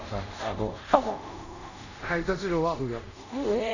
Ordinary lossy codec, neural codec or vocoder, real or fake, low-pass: none; codec, 24 kHz, 0.5 kbps, DualCodec; fake; 7.2 kHz